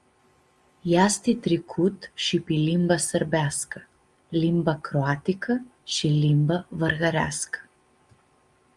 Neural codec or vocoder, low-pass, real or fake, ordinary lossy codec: none; 10.8 kHz; real; Opus, 32 kbps